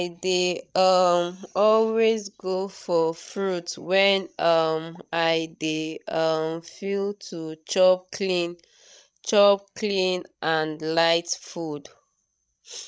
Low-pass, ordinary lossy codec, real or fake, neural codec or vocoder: none; none; fake; codec, 16 kHz, 16 kbps, FreqCodec, larger model